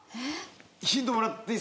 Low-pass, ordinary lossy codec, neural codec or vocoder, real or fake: none; none; none; real